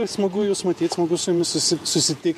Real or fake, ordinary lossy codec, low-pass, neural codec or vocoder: fake; MP3, 64 kbps; 14.4 kHz; vocoder, 48 kHz, 128 mel bands, Vocos